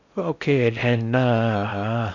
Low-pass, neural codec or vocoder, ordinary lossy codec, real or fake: 7.2 kHz; codec, 16 kHz in and 24 kHz out, 0.6 kbps, FocalCodec, streaming, 4096 codes; none; fake